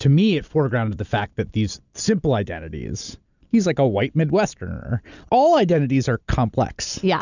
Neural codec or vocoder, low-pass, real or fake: none; 7.2 kHz; real